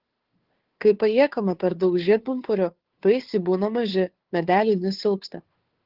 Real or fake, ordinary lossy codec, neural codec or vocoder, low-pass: fake; Opus, 16 kbps; codec, 16 kHz, 2 kbps, FunCodec, trained on Chinese and English, 25 frames a second; 5.4 kHz